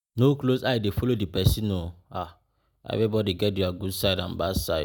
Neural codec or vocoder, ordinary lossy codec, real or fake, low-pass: none; none; real; none